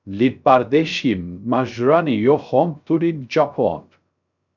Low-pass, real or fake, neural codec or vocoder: 7.2 kHz; fake; codec, 16 kHz, 0.3 kbps, FocalCodec